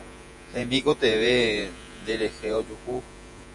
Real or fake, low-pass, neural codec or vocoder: fake; 10.8 kHz; vocoder, 48 kHz, 128 mel bands, Vocos